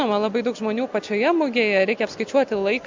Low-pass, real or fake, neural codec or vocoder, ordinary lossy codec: 7.2 kHz; real; none; MP3, 64 kbps